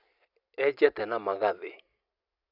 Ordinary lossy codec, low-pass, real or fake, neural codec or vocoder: none; 5.4 kHz; real; none